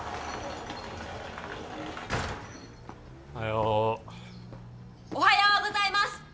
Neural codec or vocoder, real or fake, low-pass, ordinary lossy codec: none; real; none; none